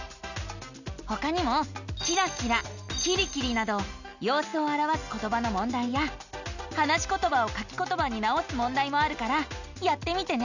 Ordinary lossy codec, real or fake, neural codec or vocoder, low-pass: none; real; none; 7.2 kHz